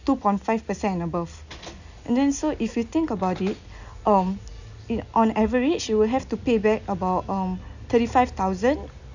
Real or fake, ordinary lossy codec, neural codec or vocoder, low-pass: real; none; none; 7.2 kHz